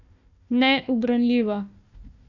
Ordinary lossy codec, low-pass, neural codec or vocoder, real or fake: none; 7.2 kHz; codec, 16 kHz, 1 kbps, FunCodec, trained on Chinese and English, 50 frames a second; fake